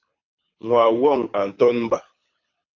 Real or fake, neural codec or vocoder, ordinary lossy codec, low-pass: fake; codec, 24 kHz, 6 kbps, HILCodec; MP3, 48 kbps; 7.2 kHz